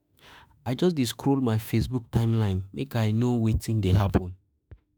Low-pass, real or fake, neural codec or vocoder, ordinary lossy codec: none; fake; autoencoder, 48 kHz, 32 numbers a frame, DAC-VAE, trained on Japanese speech; none